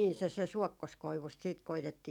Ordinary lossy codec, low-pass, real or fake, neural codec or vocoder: none; 19.8 kHz; fake; codec, 44.1 kHz, 7.8 kbps, DAC